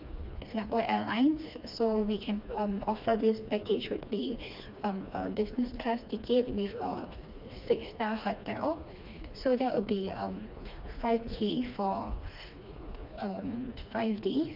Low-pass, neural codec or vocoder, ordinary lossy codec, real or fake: 5.4 kHz; codec, 16 kHz, 2 kbps, FreqCodec, smaller model; MP3, 48 kbps; fake